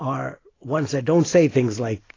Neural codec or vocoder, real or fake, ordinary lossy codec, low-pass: none; real; AAC, 32 kbps; 7.2 kHz